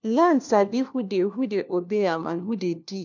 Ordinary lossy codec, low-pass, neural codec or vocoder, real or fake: none; 7.2 kHz; codec, 16 kHz, 1 kbps, FunCodec, trained on LibriTTS, 50 frames a second; fake